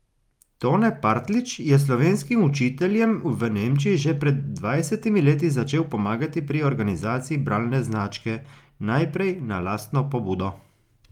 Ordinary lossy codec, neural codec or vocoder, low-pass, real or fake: Opus, 32 kbps; none; 19.8 kHz; real